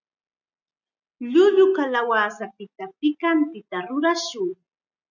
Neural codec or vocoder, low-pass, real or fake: none; 7.2 kHz; real